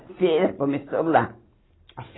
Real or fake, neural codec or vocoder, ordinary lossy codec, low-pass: real; none; AAC, 16 kbps; 7.2 kHz